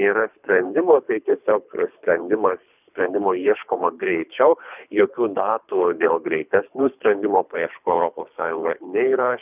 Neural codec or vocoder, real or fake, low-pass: codec, 24 kHz, 3 kbps, HILCodec; fake; 3.6 kHz